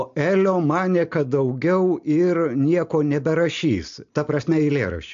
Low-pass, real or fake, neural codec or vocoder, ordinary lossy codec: 7.2 kHz; real; none; AAC, 64 kbps